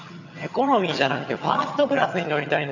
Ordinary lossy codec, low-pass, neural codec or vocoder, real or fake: none; 7.2 kHz; vocoder, 22.05 kHz, 80 mel bands, HiFi-GAN; fake